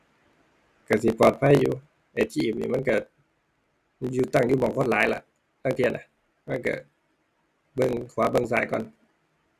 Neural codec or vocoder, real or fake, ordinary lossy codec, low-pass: none; real; none; 14.4 kHz